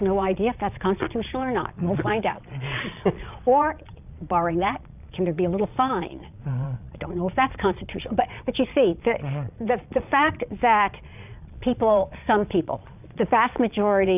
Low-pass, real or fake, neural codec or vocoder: 3.6 kHz; fake; vocoder, 22.05 kHz, 80 mel bands, Vocos